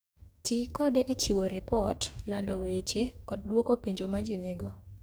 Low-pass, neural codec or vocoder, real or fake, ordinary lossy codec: none; codec, 44.1 kHz, 2.6 kbps, DAC; fake; none